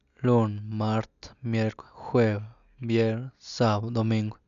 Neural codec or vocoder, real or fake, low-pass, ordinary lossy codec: none; real; 7.2 kHz; none